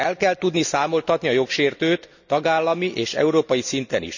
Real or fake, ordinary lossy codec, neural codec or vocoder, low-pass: real; none; none; 7.2 kHz